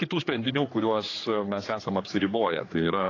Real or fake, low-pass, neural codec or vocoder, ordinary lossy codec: fake; 7.2 kHz; codec, 16 kHz, 4 kbps, X-Codec, HuBERT features, trained on general audio; AAC, 32 kbps